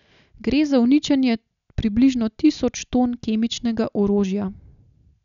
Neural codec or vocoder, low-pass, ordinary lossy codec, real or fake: none; 7.2 kHz; none; real